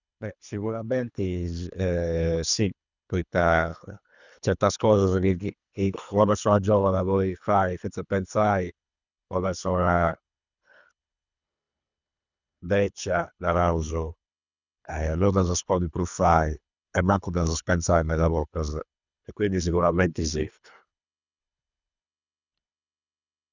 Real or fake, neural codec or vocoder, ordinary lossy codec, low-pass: fake; codec, 24 kHz, 3 kbps, HILCodec; none; 7.2 kHz